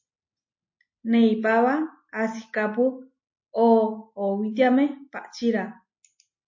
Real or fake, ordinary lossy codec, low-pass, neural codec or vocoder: real; MP3, 32 kbps; 7.2 kHz; none